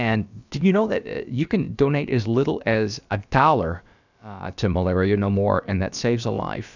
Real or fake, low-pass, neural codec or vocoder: fake; 7.2 kHz; codec, 16 kHz, about 1 kbps, DyCAST, with the encoder's durations